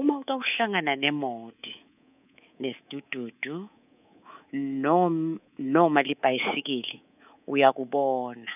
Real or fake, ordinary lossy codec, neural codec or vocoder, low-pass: fake; none; codec, 24 kHz, 3.1 kbps, DualCodec; 3.6 kHz